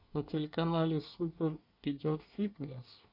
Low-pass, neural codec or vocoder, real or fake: 5.4 kHz; codec, 24 kHz, 1 kbps, SNAC; fake